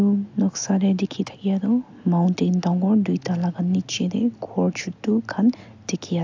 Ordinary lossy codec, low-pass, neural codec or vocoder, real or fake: AAC, 48 kbps; 7.2 kHz; none; real